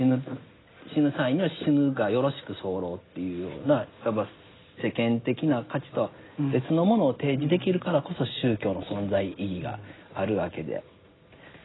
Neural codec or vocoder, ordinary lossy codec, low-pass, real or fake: none; AAC, 16 kbps; 7.2 kHz; real